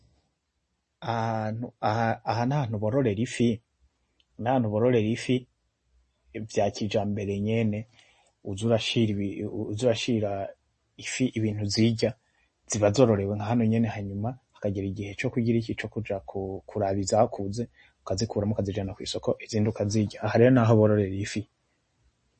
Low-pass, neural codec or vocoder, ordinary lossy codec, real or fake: 10.8 kHz; none; MP3, 32 kbps; real